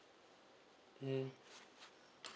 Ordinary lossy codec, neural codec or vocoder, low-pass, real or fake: none; none; none; real